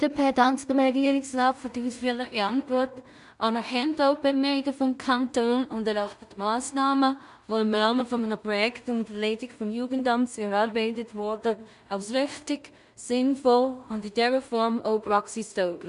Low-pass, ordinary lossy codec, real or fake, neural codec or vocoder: 10.8 kHz; none; fake; codec, 16 kHz in and 24 kHz out, 0.4 kbps, LongCat-Audio-Codec, two codebook decoder